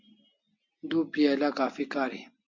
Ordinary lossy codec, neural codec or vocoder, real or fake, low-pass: MP3, 48 kbps; none; real; 7.2 kHz